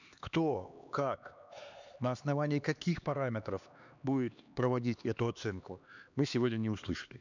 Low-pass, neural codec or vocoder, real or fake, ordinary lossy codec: 7.2 kHz; codec, 16 kHz, 2 kbps, X-Codec, HuBERT features, trained on LibriSpeech; fake; none